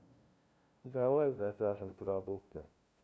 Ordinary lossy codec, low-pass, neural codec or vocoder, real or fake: none; none; codec, 16 kHz, 0.5 kbps, FunCodec, trained on LibriTTS, 25 frames a second; fake